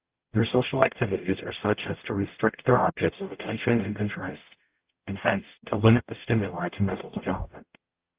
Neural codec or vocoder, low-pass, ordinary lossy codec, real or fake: codec, 44.1 kHz, 0.9 kbps, DAC; 3.6 kHz; Opus, 32 kbps; fake